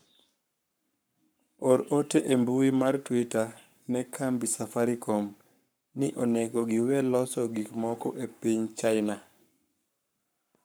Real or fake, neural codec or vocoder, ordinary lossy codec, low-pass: fake; codec, 44.1 kHz, 7.8 kbps, Pupu-Codec; none; none